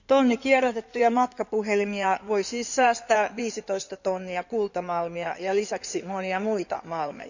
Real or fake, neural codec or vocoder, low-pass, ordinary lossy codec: fake; codec, 16 kHz in and 24 kHz out, 2.2 kbps, FireRedTTS-2 codec; 7.2 kHz; none